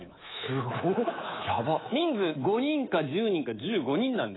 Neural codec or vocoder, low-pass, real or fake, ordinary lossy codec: codec, 24 kHz, 3.1 kbps, DualCodec; 7.2 kHz; fake; AAC, 16 kbps